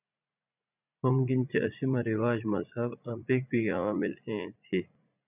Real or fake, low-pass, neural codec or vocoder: fake; 3.6 kHz; vocoder, 44.1 kHz, 80 mel bands, Vocos